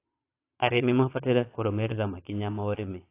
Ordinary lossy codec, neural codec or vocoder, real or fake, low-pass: AAC, 24 kbps; vocoder, 22.05 kHz, 80 mel bands, Vocos; fake; 3.6 kHz